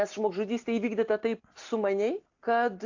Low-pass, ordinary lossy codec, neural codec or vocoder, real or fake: 7.2 kHz; AAC, 48 kbps; none; real